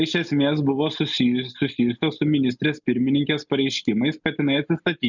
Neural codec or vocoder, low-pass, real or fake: none; 7.2 kHz; real